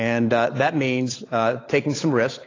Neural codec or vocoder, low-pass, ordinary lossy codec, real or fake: codec, 16 kHz, 8 kbps, FunCodec, trained on Chinese and English, 25 frames a second; 7.2 kHz; AAC, 32 kbps; fake